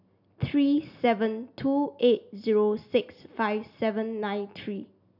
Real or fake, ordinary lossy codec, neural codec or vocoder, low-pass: real; none; none; 5.4 kHz